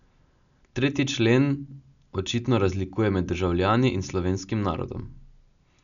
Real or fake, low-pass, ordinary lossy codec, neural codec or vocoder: real; 7.2 kHz; none; none